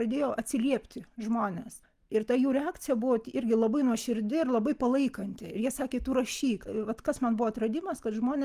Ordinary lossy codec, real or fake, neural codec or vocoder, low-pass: Opus, 32 kbps; real; none; 14.4 kHz